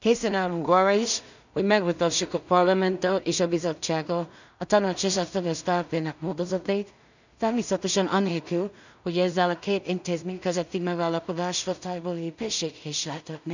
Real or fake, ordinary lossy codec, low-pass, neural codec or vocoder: fake; none; 7.2 kHz; codec, 16 kHz in and 24 kHz out, 0.4 kbps, LongCat-Audio-Codec, two codebook decoder